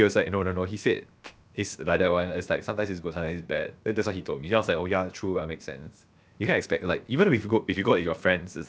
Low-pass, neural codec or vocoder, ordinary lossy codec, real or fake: none; codec, 16 kHz, 0.7 kbps, FocalCodec; none; fake